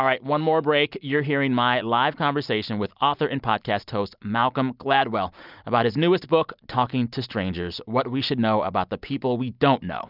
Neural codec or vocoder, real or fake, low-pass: none; real; 5.4 kHz